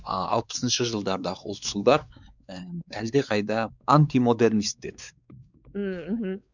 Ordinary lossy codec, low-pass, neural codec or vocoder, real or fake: none; 7.2 kHz; codec, 16 kHz, 4 kbps, X-Codec, WavLM features, trained on Multilingual LibriSpeech; fake